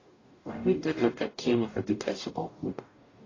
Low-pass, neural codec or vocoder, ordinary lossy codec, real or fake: 7.2 kHz; codec, 44.1 kHz, 0.9 kbps, DAC; AAC, 32 kbps; fake